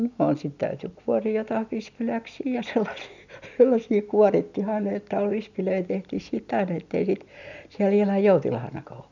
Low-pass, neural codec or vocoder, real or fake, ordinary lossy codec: 7.2 kHz; none; real; none